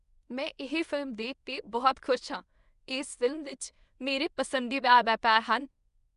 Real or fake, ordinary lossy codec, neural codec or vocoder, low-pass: fake; none; codec, 24 kHz, 0.9 kbps, WavTokenizer, medium speech release version 1; 10.8 kHz